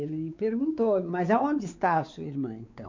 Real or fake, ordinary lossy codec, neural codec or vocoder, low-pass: fake; MP3, 64 kbps; codec, 16 kHz, 4 kbps, X-Codec, WavLM features, trained on Multilingual LibriSpeech; 7.2 kHz